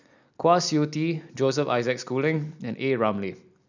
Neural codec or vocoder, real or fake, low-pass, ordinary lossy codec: none; real; 7.2 kHz; none